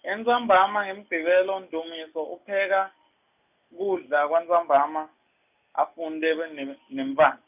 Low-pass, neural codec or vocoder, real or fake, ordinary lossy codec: 3.6 kHz; none; real; none